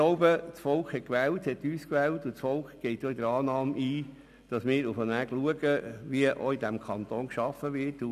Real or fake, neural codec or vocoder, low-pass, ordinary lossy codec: real; none; 14.4 kHz; none